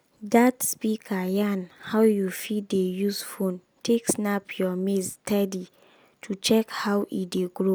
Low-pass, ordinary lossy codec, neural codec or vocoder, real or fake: none; none; none; real